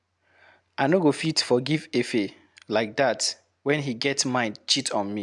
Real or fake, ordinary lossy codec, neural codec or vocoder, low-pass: real; MP3, 96 kbps; none; 10.8 kHz